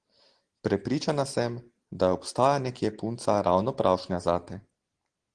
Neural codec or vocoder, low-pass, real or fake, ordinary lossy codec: none; 9.9 kHz; real; Opus, 16 kbps